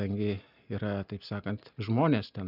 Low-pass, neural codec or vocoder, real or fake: 5.4 kHz; none; real